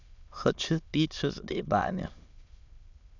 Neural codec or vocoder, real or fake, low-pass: autoencoder, 22.05 kHz, a latent of 192 numbers a frame, VITS, trained on many speakers; fake; 7.2 kHz